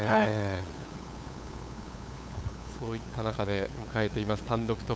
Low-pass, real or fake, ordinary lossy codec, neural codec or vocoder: none; fake; none; codec, 16 kHz, 8 kbps, FunCodec, trained on LibriTTS, 25 frames a second